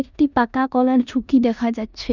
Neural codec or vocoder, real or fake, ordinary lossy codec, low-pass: codec, 16 kHz in and 24 kHz out, 0.9 kbps, LongCat-Audio-Codec, four codebook decoder; fake; none; 7.2 kHz